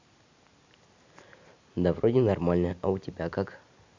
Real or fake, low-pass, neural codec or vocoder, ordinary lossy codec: real; 7.2 kHz; none; MP3, 64 kbps